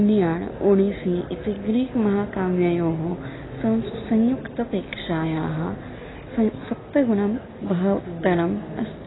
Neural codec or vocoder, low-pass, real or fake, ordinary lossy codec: none; 7.2 kHz; real; AAC, 16 kbps